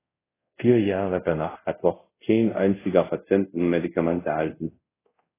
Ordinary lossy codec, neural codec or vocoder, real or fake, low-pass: AAC, 16 kbps; codec, 24 kHz, 0.5 kbps, DualCodec; fake; 3.6 kHz